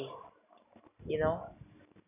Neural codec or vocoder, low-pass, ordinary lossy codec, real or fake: none; 3.6 kHz; none; real